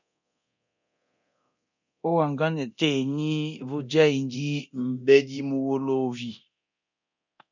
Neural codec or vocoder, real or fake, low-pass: codec, 24 kHz, 0.9 kbps, DualCodec; fake; 7.2 kHz